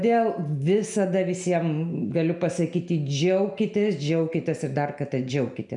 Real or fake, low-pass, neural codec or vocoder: real; 10.8 kHz; none